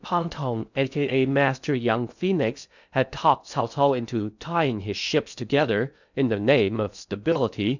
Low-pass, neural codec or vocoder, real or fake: 7.2 kHz; codec, 16 kHz in and 24 kHz out, 0.6 kbps, FocalCodec, streaming, 2048 codes; fake